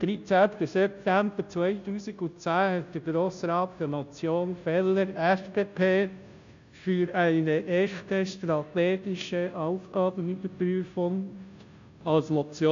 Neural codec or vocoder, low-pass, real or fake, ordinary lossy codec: codec, 16 kHz, 0.5 kbps, FunCodec, trained on Chinese and English, 25 frames a second; 7.2 kHz; fake; MP3, 64 kbps